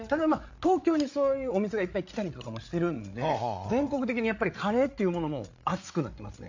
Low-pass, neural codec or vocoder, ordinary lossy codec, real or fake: 7.2 kHz; codec, 16 kHz, 8 kbps, FreqCodec, larger model; AAC, 48 kbps; fake